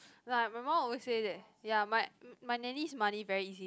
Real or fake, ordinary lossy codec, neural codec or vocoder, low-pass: real; none; none; none